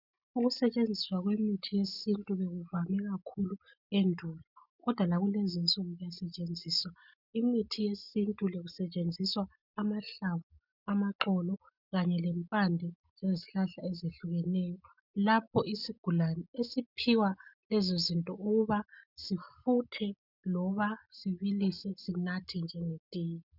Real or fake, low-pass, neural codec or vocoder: real; 5.4 kHz; none